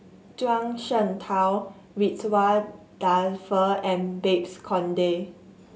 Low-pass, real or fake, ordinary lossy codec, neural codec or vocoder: none; real; none; none